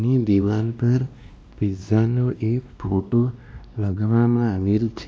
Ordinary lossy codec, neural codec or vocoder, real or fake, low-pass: none; codec, 16 kHz, 1 kbps, X-Codec, WavLM features, trained on Multilingual LibriSpeech; fake; none